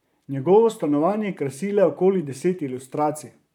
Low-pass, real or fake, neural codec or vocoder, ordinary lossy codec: 19.8 kHz; fake; vocoder, 44.1 kHz, 128 mel bands, Pupu-Vocoder; none